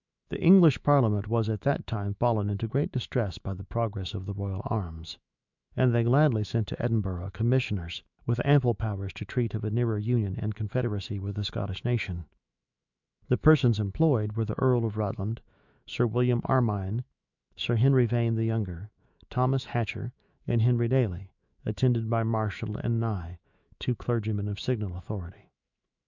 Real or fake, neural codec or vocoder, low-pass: fake; autoencoder, 48 kHz, 128 numbers a frame, DAC-VAE, trained on Japanese speech; 7.2 kHz